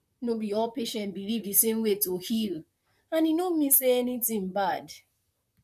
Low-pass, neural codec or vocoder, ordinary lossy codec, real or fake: 14.4 kHz; vocoder, 44.1 kHz, 128 mel bands, Pupu-Vocoder; none; fake